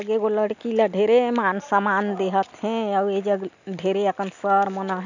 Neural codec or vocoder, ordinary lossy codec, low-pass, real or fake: none; none; 7.2 kHz; real